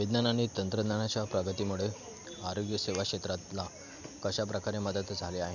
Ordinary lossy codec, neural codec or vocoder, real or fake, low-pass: none; none; real; 7.2 kHz